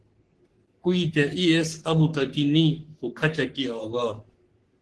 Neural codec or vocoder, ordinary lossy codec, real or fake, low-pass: codec, 44.1 kHz, 3.4 kbps, Pupu-Codec; Opus, 16 kbps; fake; 10.8 kHz